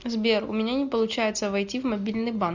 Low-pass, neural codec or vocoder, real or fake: 7.2 kHz; none; real